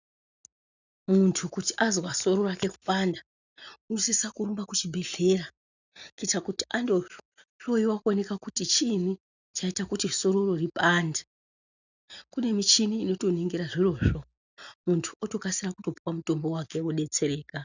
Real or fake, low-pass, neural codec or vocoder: real; 7.2 kHz; none